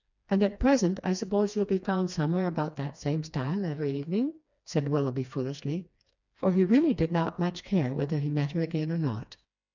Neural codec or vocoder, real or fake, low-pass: codec, 16 kHz, 2 kbps, FreqCodec, smaller model; fake; 7.2 kHz